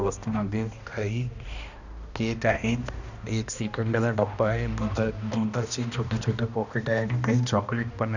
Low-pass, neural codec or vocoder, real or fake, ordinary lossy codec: 7.2 kHz; codec, 16 kHz, 1 kbps, X-Codec, HuBERT features, trained on balanced general audio; fake; none